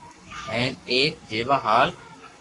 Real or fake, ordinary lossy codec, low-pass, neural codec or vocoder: fake; AAC, 32 kbps; 10.8 kHz; codec, 44.1 kHz, 7.8 kbps, Pupu-Codec